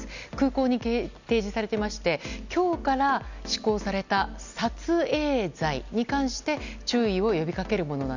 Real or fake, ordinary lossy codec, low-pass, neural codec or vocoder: real; none; 7.2 kHz; none